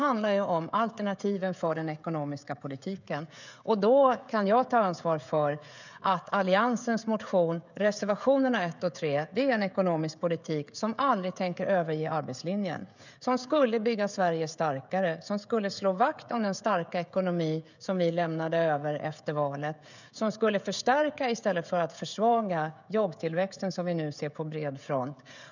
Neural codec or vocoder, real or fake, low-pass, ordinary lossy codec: codec, 16 kHz, 16 kbps, FreqCodec, smaller model; fake; 7.2 kHz; none